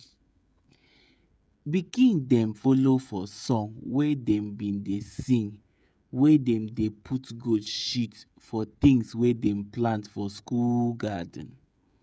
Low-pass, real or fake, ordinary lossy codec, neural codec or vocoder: none; fake; none; codec, 16 kHz, 16 kbps, FreqCodec, smaller model